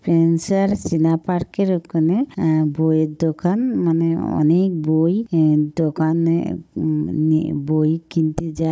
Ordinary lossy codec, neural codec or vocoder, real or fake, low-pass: none; codec, 16 kHz, 16 kbps, FunCodec, trained on Chinese and English, 50 frames a second; fake; none